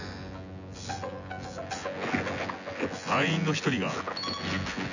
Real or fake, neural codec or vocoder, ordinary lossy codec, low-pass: fake; vocoder, 24 kHz, 100 mel bands, Vocos; none; 7.2 kHz